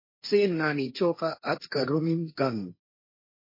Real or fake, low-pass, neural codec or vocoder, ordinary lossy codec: fake; 5.4 kHz; codec, 16 kHz, 1.1 kbps, Voila-Tokenizer; MP3, 24 kbps